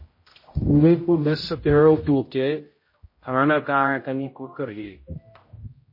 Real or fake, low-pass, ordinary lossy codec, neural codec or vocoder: fake; 5.4 kHz; MP3, 24 kbps; codec, 16 kHz, 0.5 kbps, X-Codec, HuBERT features, trained on general audio